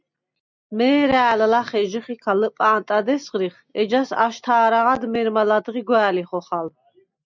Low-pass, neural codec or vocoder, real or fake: 7.2 kHz; none; real